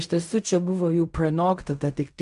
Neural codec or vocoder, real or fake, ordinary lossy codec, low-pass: codec, 16 kHz in and 24 kHz out, 0.4 kbps, LongCat-Audio-Codec, fine tuned four codebook decoder; fake; MP3, 96 kbps; 10.8 kHz